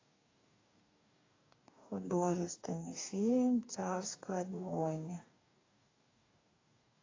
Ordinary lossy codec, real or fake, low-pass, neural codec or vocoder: AAC, 32 kbps; fake; 7.2 kHz; codec, 44.1 kHz, 2.6 kbps, DAC